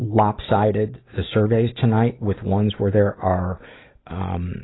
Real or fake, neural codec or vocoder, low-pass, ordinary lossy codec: real; none; 7.2 kHz; AAC, 16 kbps